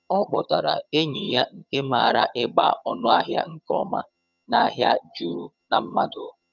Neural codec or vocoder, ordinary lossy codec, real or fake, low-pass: vocoder, 22.05 kHz, 80 mel bands, HiFi-GAN; none; fake; 7.2 kHz